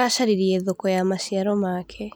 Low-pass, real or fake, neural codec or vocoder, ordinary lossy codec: none; real; none; none